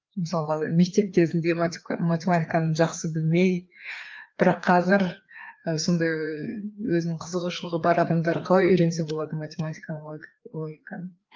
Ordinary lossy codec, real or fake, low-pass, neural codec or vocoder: Opus, 32 kbps; fake; 7.2 kHz; codec, 16 kHz, 2 kbps, FreqCodec, larger model